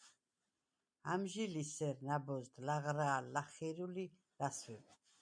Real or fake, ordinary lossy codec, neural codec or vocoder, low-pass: real; MP3, 96 kbps; none; 9.9 kHz